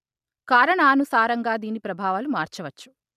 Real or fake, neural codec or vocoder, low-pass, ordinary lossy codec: real; none; 14.4 kHz; none